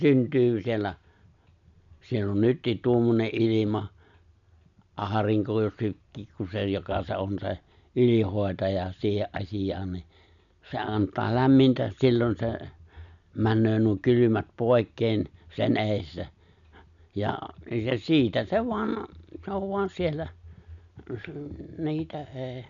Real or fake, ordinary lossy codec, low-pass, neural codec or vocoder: real; none; 7.2 kHz; none